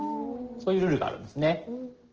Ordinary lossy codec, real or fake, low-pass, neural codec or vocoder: Opus, 16 kbps; real; 7.2 kHz; none